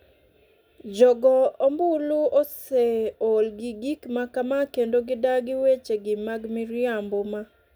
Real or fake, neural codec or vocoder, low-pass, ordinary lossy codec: real; none; none; none